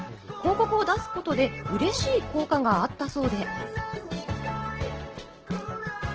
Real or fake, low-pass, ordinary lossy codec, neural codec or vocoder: real; 7.2 kHz; Opus, 16 kbps; none